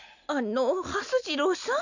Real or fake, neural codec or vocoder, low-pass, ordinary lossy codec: real; none; 7.2 kHz; none